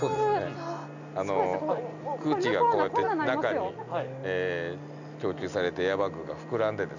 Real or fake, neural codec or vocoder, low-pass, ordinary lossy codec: real; none; 7.2 kHz; none